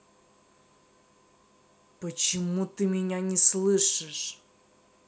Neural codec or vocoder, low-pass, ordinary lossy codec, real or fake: none; none; none; real